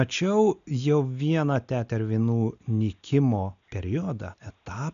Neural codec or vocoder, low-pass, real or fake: none; 7.2 kHz; real